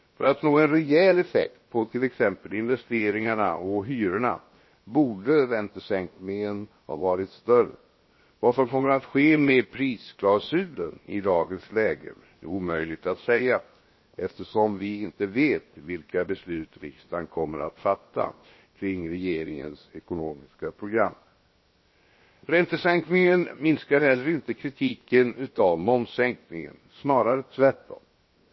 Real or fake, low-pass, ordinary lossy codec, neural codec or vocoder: fake; 7.2 kHz; MP3, 24 kbps; codec, 16 kHz, 0.7 kbps, FocalCodec